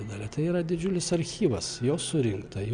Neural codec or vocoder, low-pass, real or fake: none; 9.9 kHz; real